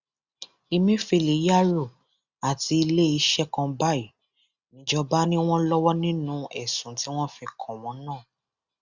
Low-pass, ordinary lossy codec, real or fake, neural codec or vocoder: 7.2 kHz; Opus, 64 kbps; real; none